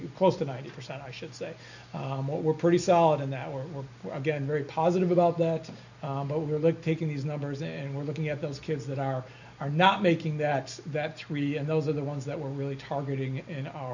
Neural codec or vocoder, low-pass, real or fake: none; 7.2 kHz; real